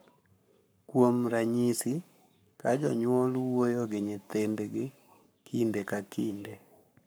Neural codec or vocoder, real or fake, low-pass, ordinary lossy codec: codec, 44.1 kHz, 7.8 kbps, Pupu-Codec; fake; none; none